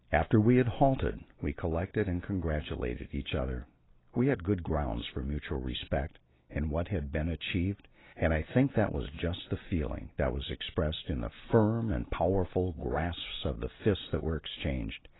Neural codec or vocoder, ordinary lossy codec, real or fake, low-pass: none; AAC, 16 kbps; real; 7.2 kHz